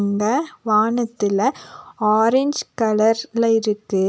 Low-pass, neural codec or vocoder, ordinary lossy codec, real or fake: none; none; none; real